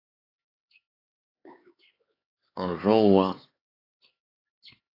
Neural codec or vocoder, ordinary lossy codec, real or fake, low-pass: codec, 16 kHz, 4 kbps, X-Codec, HuBERT features, trained on LibriSpeech; AAC, 24 kbps; fake; 5.4 kHz